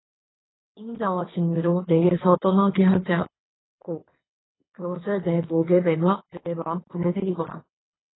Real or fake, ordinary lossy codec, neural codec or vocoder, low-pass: fake; AAC, 16 kbps; codec, 24 kHz, 6 kbps, HILCodec; 7.2 kHz